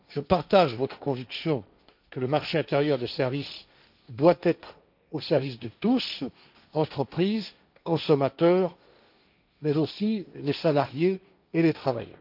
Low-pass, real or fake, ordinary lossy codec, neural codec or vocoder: 5.4 kHz; fake; none; codec, 16 kHz, 1.1 kbps, Voila-Tokenizer